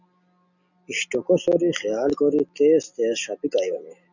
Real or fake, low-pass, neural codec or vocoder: real; 7.2 kHz; none